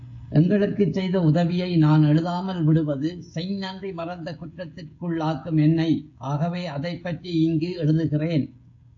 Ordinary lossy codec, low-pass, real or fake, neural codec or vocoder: AAC, 48 kbps; 7.2 kHz; fake; codec, 16 kHz, 16 kbps, FreqCodec, smaller model